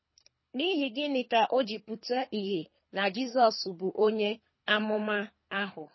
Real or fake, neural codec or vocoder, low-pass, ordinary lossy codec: fake; codec, 24 kHz, 3 kbps, HILCodec; 7.2 kHz; MP3, 24 kbps